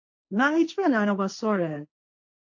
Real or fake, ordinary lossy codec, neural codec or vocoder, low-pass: fake; AAC, 48 kbps; codec, 16 kHz, 1.1 kbps, Voila-Tokenizer; 7.2 kHz